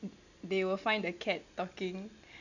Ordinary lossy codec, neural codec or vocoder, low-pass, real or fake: none; none; 7.2 kHz; real